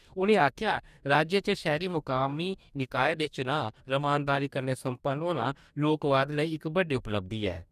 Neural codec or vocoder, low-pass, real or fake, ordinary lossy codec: codec, 44.1 kHz, 2.6 kbps, DAC; 14.4 kHz; fake; none